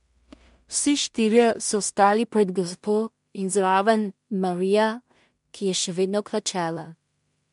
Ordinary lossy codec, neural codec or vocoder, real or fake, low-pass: MP3, 64 kbps; codec, 16 kHz in and 24 kHz out, 0.4 kbps, LongCat-Audio-Codec, two codebook decoder; fake; 10.8 kHz